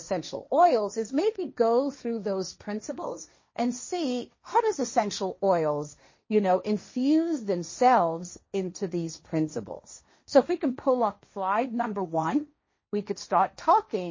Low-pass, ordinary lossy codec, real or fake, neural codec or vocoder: 7.2 kHz; MP3, 32 kbps; fake; codec, 16 kHz, 1.1 kbps, Voila-Tokenizer